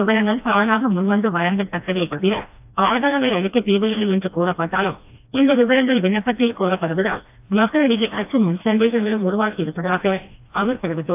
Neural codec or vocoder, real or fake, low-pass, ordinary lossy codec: codec, 16 kHz, 1 kbps, FreqCodec, smaller model; fake; 3.6 kHz; AAC, 32 kbps